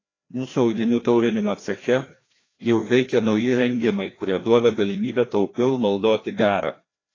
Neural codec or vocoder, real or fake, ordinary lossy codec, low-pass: codec, 16 kHz, 1 kbps, FreqCodec, larger model; fake; AAC, 32 kbps; 7.2 kHz